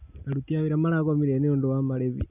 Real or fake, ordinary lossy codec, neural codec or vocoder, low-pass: real; none; none; 3.6 kHz